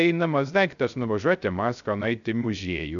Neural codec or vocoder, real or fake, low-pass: codec, 16 kHz, 0.3 kbps, FocalCodec; fake; 7.2 kHz